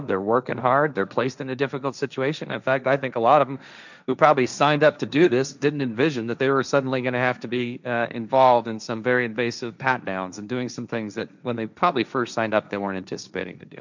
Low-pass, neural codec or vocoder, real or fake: 7.2 kHz; codec, 16 kHz, 1.1 kbps, Voila-Tokenizer; fake